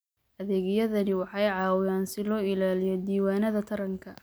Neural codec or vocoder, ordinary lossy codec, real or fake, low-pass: none; none; real; none